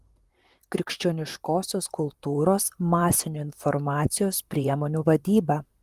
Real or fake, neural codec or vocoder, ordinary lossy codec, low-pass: fake; vocoder, 44.1 kHz, 128 mel bands, Pupu-Vocoder; Opus, 24 kbps; 14.4 kHz